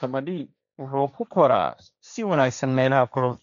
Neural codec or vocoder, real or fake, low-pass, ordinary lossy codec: codec, 16 kHz, 1.1 kbps, Voila-Tokenizer; fake; 7.2 kHz; none